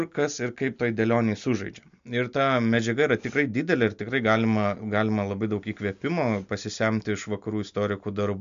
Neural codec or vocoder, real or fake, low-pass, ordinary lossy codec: none; real; 7.2 kHz; MP3, 64 kbps